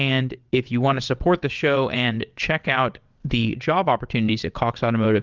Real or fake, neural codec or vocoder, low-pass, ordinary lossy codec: fake; vocoder, 22.05 kHz, 80 mel bands, WaveNeXt; 7.2 kHz; Opus, 32 kbps